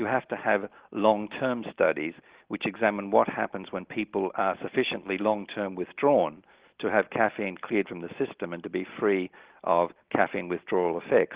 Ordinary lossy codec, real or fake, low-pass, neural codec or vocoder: Opus, 16 kbps; real; 3.6 kHz; none